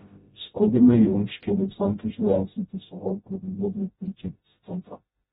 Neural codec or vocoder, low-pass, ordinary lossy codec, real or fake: codec, 16 kHz, 0.5 kbps, FreqCodec, smaller model; 7.2 kHz; AAC, 16 kbps; fake